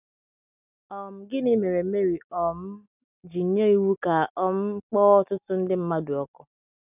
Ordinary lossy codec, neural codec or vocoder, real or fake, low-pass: none; none; real; 3.6 kHz